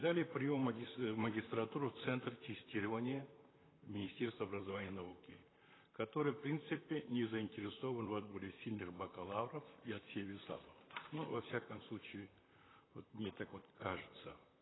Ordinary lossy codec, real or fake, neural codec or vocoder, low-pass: AAC, 16 kbps; fake; vocoder, 44.1 kHz, 128 mel bands, Pupu-Vocoder; 7.2 kHz